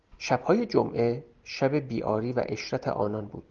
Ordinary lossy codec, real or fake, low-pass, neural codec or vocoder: Opus, 24 kbps; real; 7.2 kHz; none